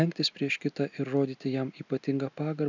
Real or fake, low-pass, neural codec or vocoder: real; 7.2 kHz; none